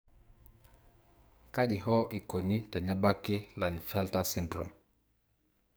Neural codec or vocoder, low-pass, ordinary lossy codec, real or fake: codec, 44.1 kHz, 2.6 kbps, SNAC; none; none; fake